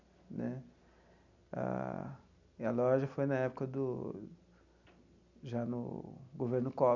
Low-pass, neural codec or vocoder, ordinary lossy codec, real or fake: 7.2 kHz; none; none; real